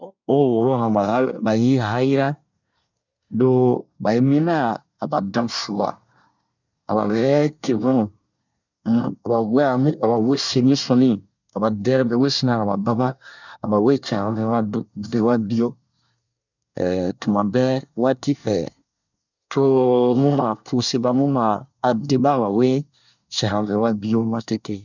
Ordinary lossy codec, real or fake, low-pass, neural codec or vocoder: none; fake; 7.2 kHz; codec, 24 kHz, 1 kbps, SNAC